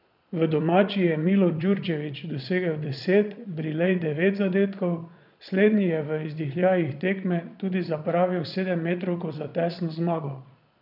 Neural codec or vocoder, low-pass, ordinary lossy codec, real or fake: vocoder, 22.05 kHz, 80 mel bands, Vocos; 5.4 kHz; none; fake